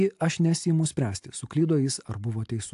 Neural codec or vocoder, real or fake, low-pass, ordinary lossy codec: none; real; 10.8 kHz; AAC, 64 kbps